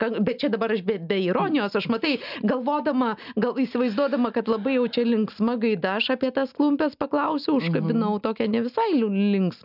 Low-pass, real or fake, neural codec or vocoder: 5.4 kHz; real; none